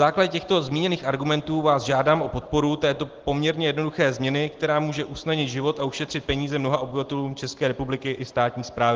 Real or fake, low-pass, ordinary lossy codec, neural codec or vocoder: real; 7.2 kHz; Opus, 16 kbps; none